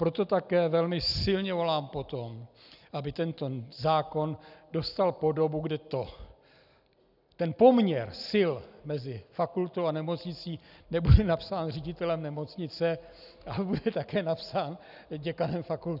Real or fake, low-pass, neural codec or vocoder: real; 5.4 kHz; none